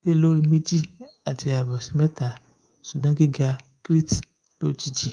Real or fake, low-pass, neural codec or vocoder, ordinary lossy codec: fake; 9.9 kHz; codec, 24 kHz, 3.1 kbps, DualCodec; Opus, 32 kbps